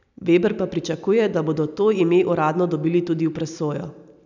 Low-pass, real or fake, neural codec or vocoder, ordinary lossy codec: 7.2 kHz; real; none; none